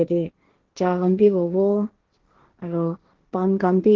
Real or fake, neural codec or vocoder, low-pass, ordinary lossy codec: fake; codec, 16 kHz, 1.1 kbps, Voila-Tokenizer; 7.2 kHz; Opus, 16 kbps